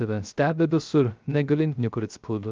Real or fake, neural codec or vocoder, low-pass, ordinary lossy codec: fake; codec, 16 kHz, 0.3 kbps, FocalCodec; 7.2 kHz; Opus, 24 kbps